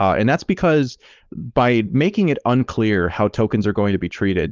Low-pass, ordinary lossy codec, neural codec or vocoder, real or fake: 7.2 kHz; Opus, 24 kbps; codec, 16 kHz, 4.8 kbps, FACodec; fake